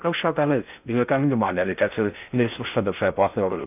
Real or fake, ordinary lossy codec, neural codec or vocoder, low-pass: fake; none; codec, 16 kHz in and 24 kHz out, 0.6 kbps, FocalCodec, streaming, 2048 codes; 3.6 kHz